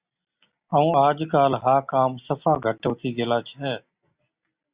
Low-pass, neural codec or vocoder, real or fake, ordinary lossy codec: 3.6 kHz; none; real; Opus, 64 kbps